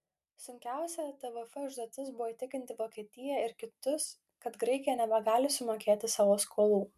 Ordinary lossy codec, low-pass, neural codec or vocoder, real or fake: MP3, 96 kbps; 14.4 kHz; none; real